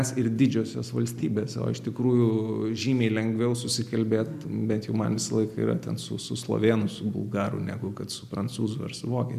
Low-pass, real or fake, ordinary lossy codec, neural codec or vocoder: 14.4 kHz; real; MP3, 96 kbps; none